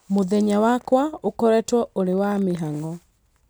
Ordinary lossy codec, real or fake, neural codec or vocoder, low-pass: none; real; none; none